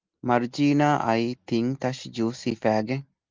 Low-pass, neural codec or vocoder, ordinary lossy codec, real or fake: 7.2 kHz; none; Opus, 24 kbps; real